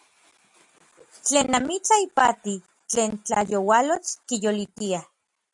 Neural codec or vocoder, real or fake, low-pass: none; real; 10.8 kHz